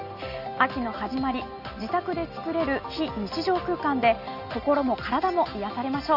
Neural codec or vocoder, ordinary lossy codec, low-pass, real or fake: none; Opus, 32 kbps; 5.4 kHz; real